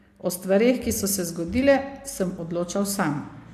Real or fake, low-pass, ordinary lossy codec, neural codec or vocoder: real; 14.4 kHz; AAC, 64 kbps; none